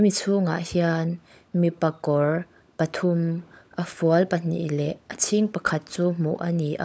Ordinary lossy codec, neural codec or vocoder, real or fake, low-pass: none; codec, 16 kHz, 16 kbps, FunCodec, trained on LibriTTS, 50 frames a second; fake; none